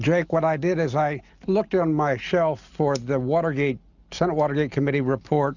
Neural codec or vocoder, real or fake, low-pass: none; real; 7.2 kHz